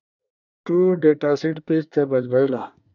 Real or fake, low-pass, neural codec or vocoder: fake; 7.2 kHz; codec, 24 kHz, 1 kbps, SNAC